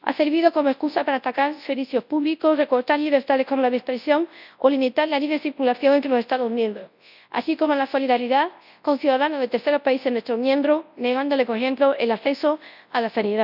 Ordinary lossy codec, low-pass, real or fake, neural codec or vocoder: none; 5.4 kHz; fake; codec, 24 kHz, 0.9 kbps, WavTokenizer, large speech release